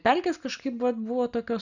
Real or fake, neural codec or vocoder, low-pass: real; none; 7.2 kHz